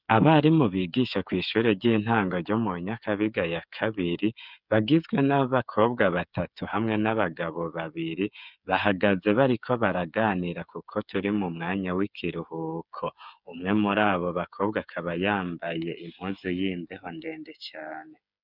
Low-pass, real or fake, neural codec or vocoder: 5.4 kHz; fake; codec, 16 kHz, 8 kbps, FreqCodec, smaller model